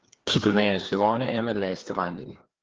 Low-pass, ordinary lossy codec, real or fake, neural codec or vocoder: 7.2 kHz; Opus, 16 kbps; fake; codec, 16 kHz, 2 kbps, FreqCodec, larger model